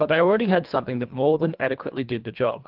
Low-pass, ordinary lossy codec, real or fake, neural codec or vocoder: 5.4 kHz; Opus, 32 kbps; fake; codec, 24 kHz, 1.5 kbps, HILCodec